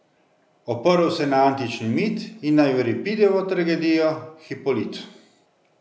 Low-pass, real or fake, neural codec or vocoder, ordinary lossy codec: none; real; none; none